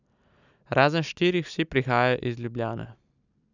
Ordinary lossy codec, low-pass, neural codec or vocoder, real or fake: none; 7.2 kHz; none; real